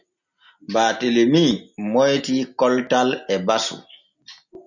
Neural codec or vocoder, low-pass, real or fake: none; 7.2 kHz; real